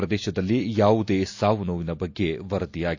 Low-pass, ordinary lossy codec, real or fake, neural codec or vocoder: 7.2 kHz; AAC, 48 kbps; real; none